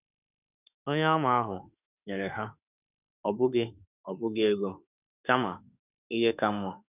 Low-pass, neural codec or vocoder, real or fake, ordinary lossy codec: 3.6 kHz; autoencoder, 48 kHz, 32 numbers a frame, DAC-VAE, trained on Japanese speech; fake; none